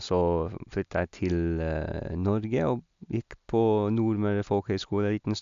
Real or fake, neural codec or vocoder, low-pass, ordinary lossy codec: real; none; 7.2 kHz; AAC, 96 kbps